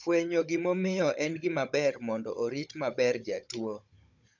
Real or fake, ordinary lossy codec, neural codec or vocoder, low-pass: fake; none; codec, 16 kHz, 16 kbps, FunCodec, trained on Chinese and English, 50 frames a second; 7.2 kHz